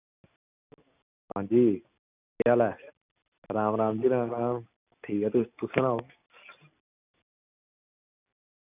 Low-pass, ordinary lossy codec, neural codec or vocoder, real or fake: 3.6 kHz; none; none; real